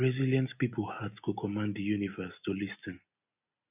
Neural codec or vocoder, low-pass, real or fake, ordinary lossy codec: none; 3.6 kHz; real; none